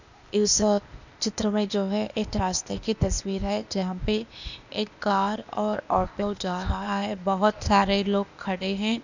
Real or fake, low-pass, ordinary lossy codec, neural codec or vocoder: fake; 7.2 kHz; none; codec, 16 kHz, 0.8 kbps, ZipCodec